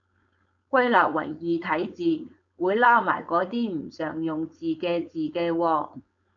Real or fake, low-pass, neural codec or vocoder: fake; 7.2 kHz; codec, 16 kHz, 4.8 kbps, FACodec